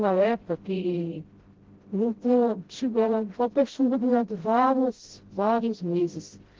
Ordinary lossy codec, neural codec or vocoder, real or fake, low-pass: Opus, 16 kbps; codec, 16 kHz, 0.5 kbps, FreqCodec, smaller model; fake; 7.2 kHz